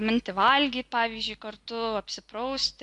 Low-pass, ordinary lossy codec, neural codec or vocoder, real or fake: 10.8 kHz; AAC, 64 kbps; none; real